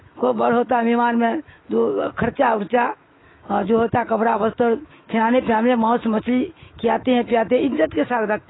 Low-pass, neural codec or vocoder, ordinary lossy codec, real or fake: 7.2 kHz; autoencoder, 48 kHz, 128 numbers a frame, DAC-VAE, trained on Japanese speech; AAC, 16 kbps; fake